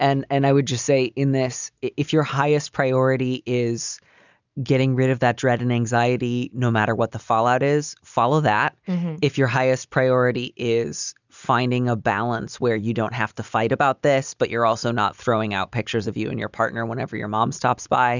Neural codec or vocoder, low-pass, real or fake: none; 7.2 kHz; real